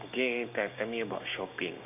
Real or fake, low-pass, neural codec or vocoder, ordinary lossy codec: fake; 3.6 kHz; codec, 16 kHz, 6 kbps, DAC; none